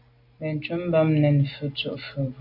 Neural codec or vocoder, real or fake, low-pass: none; real; 5.4 kHz